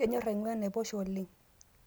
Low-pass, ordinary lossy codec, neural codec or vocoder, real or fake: none; none; none; real